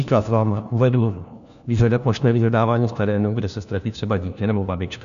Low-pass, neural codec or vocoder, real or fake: 7.2 kHz; codec, 16 kHz, 1 kbps, FunCodec, trained on LibriTTS, 50 frames a second; fake